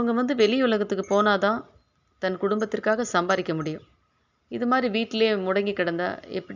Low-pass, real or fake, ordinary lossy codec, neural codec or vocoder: 7.2 kHz; real; none; none